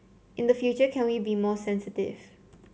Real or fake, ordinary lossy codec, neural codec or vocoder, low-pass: real; none; none; none